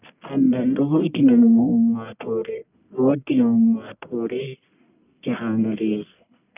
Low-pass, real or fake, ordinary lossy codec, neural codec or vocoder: 3.6 kHz; fake; none; codec, 44.1 kHz, 1.7 kbps, Pupu-Codec